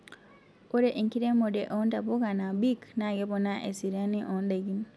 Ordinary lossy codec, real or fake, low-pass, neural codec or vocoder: none; real; none; none